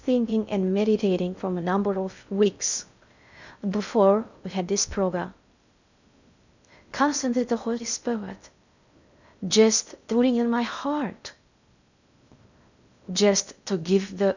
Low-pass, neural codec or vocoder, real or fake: 7.2 kHz; codec, 16 kHz in and 24 kHz out, 0.6 kbps, FocalCodec, streaming, 2048 codes; fake